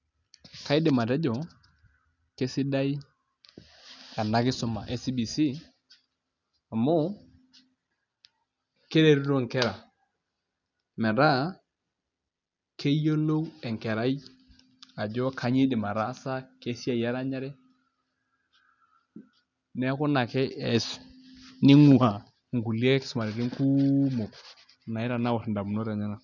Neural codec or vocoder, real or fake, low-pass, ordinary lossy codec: none; real; 7.2 kHz; none